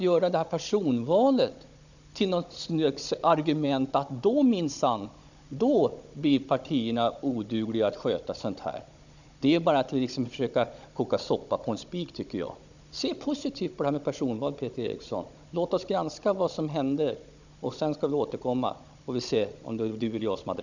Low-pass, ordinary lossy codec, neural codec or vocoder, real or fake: 7.2 kHz; none; codec, 16 kHz, 16 kbps, FunCodec, trained on Chinese and English, 50 frames a second; fake